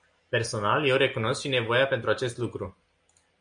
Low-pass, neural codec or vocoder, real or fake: 9.9 kHz; none; real